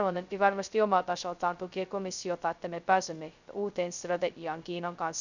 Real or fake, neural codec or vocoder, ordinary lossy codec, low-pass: fake; codec, 16 kHz, 0.2 kbps, FocalCodec; none; 7.2 kHz